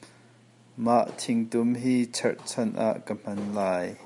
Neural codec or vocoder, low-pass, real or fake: none; 10.8 kHz; real